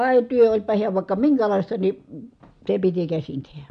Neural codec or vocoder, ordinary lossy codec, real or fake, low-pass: none; MP3, 64 kbps; real; 9.9 kHz